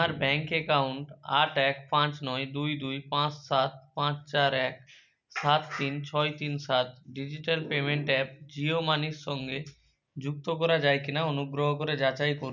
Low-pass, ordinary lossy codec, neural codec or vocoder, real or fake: 7.2 kHz; none; none; real